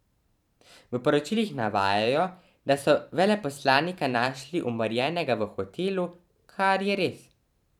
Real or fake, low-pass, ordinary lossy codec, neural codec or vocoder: fake; 19.8 kHz; none; vocoder, 44.1 kHz, 128 mel bands every 512 samples, BigVGAN v2